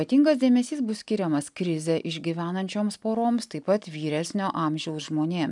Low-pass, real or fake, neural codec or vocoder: 10.8 kHz; real; none